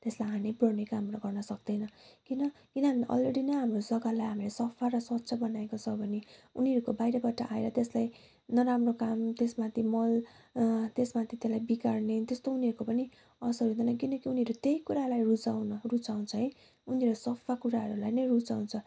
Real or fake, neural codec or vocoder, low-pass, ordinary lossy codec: real; none; none; none